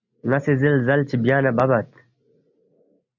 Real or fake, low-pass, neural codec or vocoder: real; 7.2 kHz; none